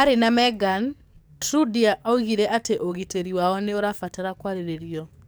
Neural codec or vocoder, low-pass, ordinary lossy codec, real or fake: codec, 44.1 kHz, 7.8 kbps, DAC; none; none; fake